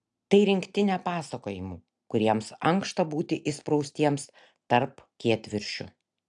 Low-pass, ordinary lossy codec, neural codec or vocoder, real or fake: 10.8 kHz; AAC, 64 kbps; none; real